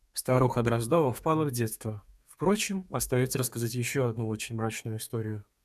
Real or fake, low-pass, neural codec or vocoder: fake; 14.4 kHz; codec, 32 kHz, 1.9 kbps, SNAC